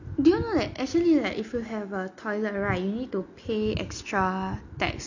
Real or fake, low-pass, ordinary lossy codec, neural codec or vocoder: real; 7.2 kHz; none; none